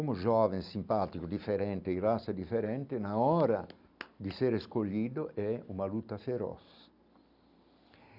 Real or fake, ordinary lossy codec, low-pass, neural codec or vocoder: real; none; 5.4 kHz; none